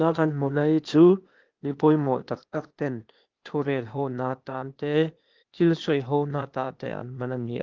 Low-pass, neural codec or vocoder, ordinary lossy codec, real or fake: 7.2 kHz; codec, 16 kHz, 0.8 kbps, ZipCodec; Opus, 32 kbps; fake